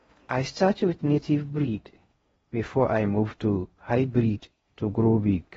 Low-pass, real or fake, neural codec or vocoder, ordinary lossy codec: 10.8 kHz; fake; codec, 16 kHz in and 24 kHz out, 0.6 kbps, FocalCodec, streaming, 4096 codes; AAC, 24 kbps